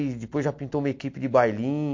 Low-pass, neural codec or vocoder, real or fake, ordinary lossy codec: 7.2 kHz; none; real; MP3, 48 kbps